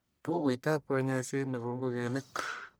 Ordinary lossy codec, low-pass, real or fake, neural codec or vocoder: none; none; fake; codec, 44.1 kHz, 1.7 kbps, Pupu-Codec